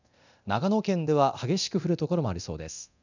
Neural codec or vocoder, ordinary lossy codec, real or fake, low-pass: codec, 24 kHz, 0.9 kbps, DualCodec; none; fake; 7.2 kHz